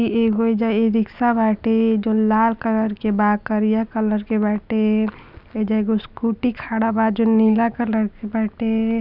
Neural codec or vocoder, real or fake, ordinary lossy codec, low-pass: none; real; none; 5.4 kHz